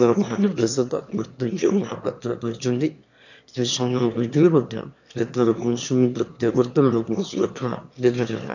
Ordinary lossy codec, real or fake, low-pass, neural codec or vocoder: none; fake; 7.2 kHz; autoencoder, 22.05 kHz, a latent of 192 numbers a frame, VITS, trained on one speaker